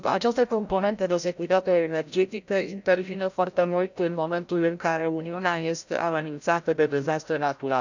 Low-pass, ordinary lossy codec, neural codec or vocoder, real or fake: 7.2 kHz; none; codec, 16 kHz, 0.5 kbps, FreqCodec, larger model; fake